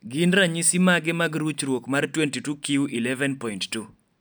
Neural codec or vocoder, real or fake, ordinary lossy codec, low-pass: none; real; none; none